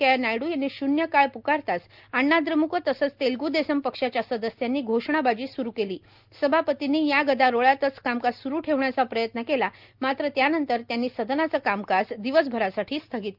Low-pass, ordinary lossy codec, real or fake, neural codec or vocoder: 5.4 kHz; Opus, 24 kbps; real; none